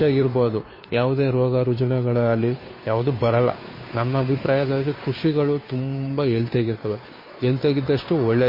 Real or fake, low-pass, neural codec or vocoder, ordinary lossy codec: fake; 5.4 kHz; codec, 16 kHz, 2 kbps, FunCodec, trained on Chinese and English, 25 frames a second; MP3, 24 kbps